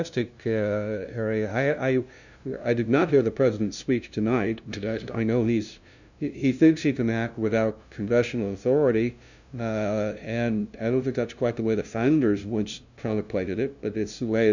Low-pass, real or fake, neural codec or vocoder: 7.2 kHz; fake; codec, 16 kHz, 0.5 kbps, FunCodec, trained on LibriTTS, 25 frames a second